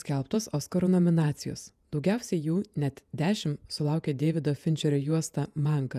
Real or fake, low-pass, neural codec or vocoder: fake; 14.4 kHz; vocoder, 48 kHz, 128 mel bands, Vocos